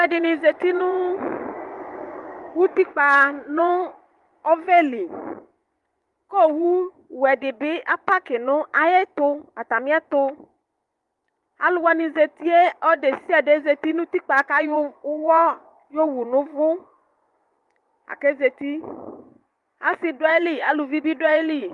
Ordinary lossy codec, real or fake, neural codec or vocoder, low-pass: Opus, 32 kbps; fake; vocoder, 24 kHz, 100 mel bands, Vocos; 10.8 kHz